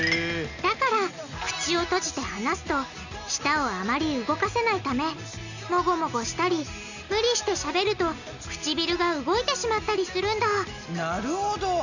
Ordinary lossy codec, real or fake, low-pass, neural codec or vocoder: none; real; 7.2 kHz; none